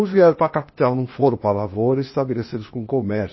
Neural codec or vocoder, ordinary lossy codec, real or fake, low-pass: codec, 16 kHz, 0.8 kbps, ZipCodec; MP3, 24 kbps; fake; 7.2 kHz